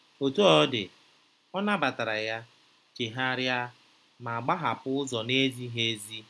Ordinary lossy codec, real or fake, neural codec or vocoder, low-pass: none; real; none; none